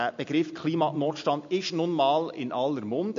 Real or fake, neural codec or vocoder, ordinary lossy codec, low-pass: real; none; MP3, 48 kbps; 7.2 kHz